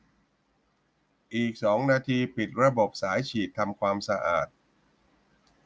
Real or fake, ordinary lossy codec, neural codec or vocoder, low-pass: real; none; none; none